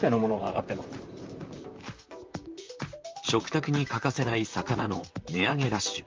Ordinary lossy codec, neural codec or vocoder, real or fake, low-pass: Opus, 32 kbps; vocoder, 44.1 kHz, 128 mel bands, Pupu-Vocoder; fake; 7.2 kHz